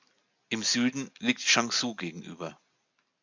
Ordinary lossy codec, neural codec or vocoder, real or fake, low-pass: MP3, 64 kbps; none; real; 7.2 kHz